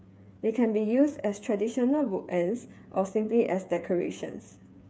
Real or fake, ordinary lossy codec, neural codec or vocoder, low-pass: fake; none; codec, 16 kHz, 8 kbps, FreqCodec, smaller model; none